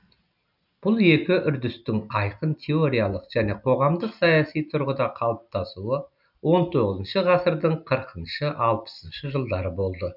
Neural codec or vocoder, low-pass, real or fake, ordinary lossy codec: none; 5.4 kHz; real; none